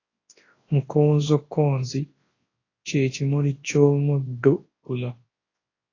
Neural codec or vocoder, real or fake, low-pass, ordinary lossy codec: codec, 24 kHz, 0.9 kbps, WavTokenizer, large speech release; fake; 7.2 kHz; AAC, 32 kbps